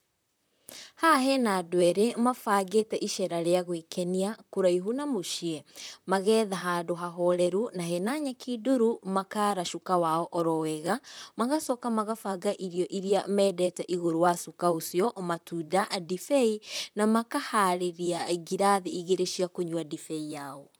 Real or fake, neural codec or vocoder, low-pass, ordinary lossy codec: fake; vocoder, 44.1 kHz, 128 mel bands, Pupu-Vocoder; none; none